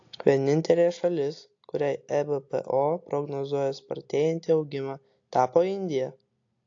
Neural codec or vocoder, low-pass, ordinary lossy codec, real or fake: none; 7.2 kHz; AAC, 48 kbps; real